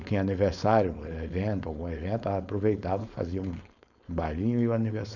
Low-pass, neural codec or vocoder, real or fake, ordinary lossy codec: 7.2 kHz; codec, 16 kHz, 4.8 kbps, FACodec; fake; none